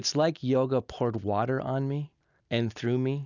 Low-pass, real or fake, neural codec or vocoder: 7.2 kHz; real; none